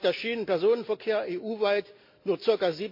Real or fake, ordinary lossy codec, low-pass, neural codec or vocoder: real; MP3, 32 kbps; 5.4 kHz; none